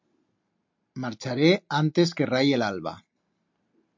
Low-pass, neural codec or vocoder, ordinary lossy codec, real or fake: 7.2 kHz; none; MP3, 48 kbps; real